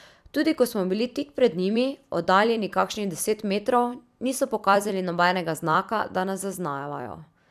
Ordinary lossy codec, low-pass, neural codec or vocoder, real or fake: none; 14.4 kHz; vocoder, 44.1 kHz, 128 mel bands every 256 samples, BigVGAN v2; fake